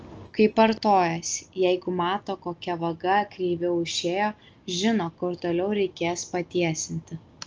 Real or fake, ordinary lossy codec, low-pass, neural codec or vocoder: real; Opus, 24 kbps; 7.2 kHz; none